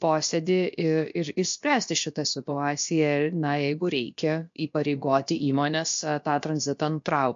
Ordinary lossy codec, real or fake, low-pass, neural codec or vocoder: MP3, 48 kbps; fake; 7.2 kHz; codec, 16 kHz, about 1 kbps, DyCAST, with the encoder's durations